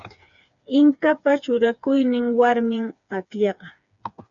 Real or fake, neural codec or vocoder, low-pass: fake; codec, 16 kHz, 4 kbps, FreqCodec, smaller model; 7.2 kHz